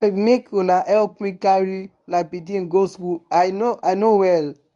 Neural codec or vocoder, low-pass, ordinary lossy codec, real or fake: codec, 24 kHz, 0.9 kbps, WavTokenizer, medium speech release version 1; 10.8 kHz; none; fake